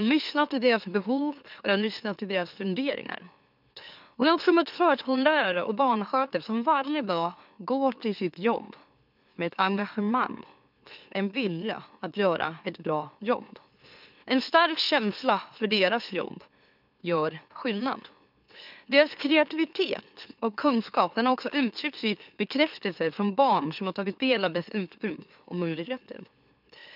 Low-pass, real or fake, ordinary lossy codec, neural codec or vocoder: 5.4 kHz; fake; none; autoencoder, 44.1 kHz, a latent of 192 numbers a frame, MeloTTS